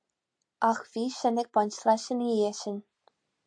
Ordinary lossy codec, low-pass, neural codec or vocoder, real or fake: AAC, 64 kbps; 9.9 kHz; none; real